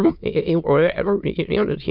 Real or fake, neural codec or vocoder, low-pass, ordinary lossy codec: fake; autoencoder, 22.05 kHz, a latent of 192 numbers a frame, VITS, trained on many speakers; 5.4 kHz; none